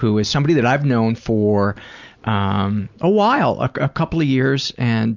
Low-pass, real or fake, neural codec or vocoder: 7.2 kHz; real; none